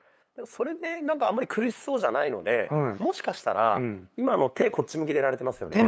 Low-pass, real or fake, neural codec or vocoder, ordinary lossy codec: none; fake; codec, 16 kHz, 8 kbps, FunCodec, trained on LibriTTS, 25 frames a second; none